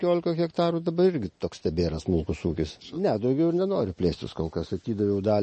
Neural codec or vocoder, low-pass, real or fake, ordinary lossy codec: none; 9.9 kHz; real; MP3, 32 kbps